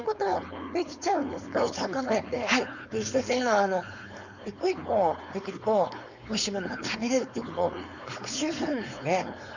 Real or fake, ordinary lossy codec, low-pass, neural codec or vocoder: fake; none; 7.2 kHz; codec, 16 kHz, 4.8 kbps, FACodec